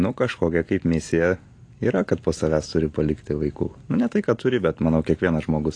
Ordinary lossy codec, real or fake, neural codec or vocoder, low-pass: AAC, 48 kbps; real; none; 9.9 kHz